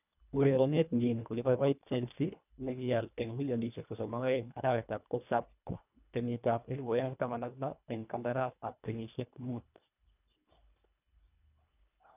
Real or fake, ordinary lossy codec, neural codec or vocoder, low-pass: fake; none; codec, 24 kHz, 1.5 kbps, HILCodec; 3.6 kHz